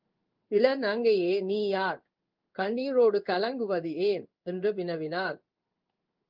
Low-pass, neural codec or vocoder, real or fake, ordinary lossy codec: 5.4 kHz; codec, 16 kHz in and 24 kHz out, 1 kbps, XY-Tokenizer; fake; Opus, 24 kbps